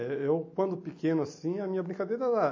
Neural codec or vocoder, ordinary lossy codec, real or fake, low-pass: none; MP3, 32 kbps; real; 7.2 kHz